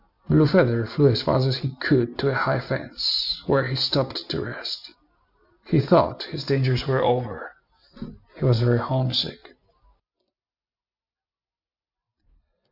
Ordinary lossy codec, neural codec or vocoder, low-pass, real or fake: Opus, 64 kbps; none; 5.4 kHz; real